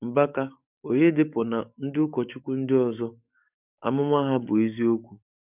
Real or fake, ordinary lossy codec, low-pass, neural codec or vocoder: fake; none; 3.6 kHz; codec, 44.1 kHz, 7.8 kbps, DAC